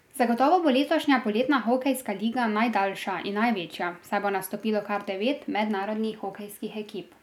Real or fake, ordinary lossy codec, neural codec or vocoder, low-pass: real; none; none; 19.8 kHz